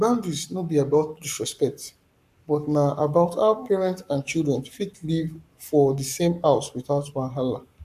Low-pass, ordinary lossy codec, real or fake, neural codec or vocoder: 14.4 kHz; none; fake; codec, 44.1 kHz, 7.8 kbps, Pupu-Codec